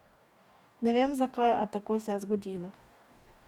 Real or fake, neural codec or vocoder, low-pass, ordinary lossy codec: fake; codec, 44.1 kHz, 2.6 kbps, DAC; 19.8 kHz; none